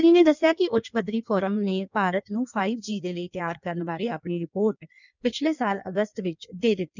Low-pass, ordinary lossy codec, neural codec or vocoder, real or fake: 7.2 kHz; none; codec, 16 kHz in and 24 kHz out, 1.1 kbps, FireRedTTS-2 codec; fake